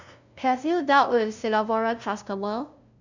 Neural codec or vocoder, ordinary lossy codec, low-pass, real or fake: codec, 16 kHz, 0.5 kbps, FunCodec, trained on LibriTTS, 25 frames a second; none; 7.2 kHz; fake